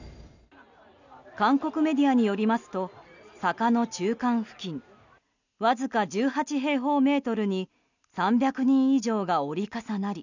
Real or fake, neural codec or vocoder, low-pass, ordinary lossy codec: real; none; 7.2 kHz; none